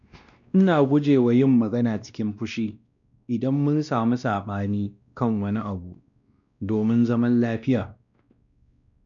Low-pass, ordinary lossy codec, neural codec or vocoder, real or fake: 7.2 kHz; none; codec, 16 kHz, 1 kbps, X-Codec, WavLM features, trained on Multilingual LibriSpeech; fake